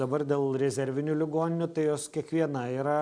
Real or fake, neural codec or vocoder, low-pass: real; none; 9.9 kHz